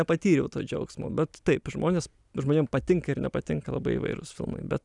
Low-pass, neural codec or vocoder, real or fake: 10.8 kHz; none; real